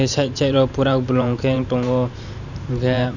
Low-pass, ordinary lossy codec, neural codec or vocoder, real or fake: 7.2 kHz; none; vocoder, 44.1 kHz, 128 mel bands every 512 samples, BigVGAN v2; fake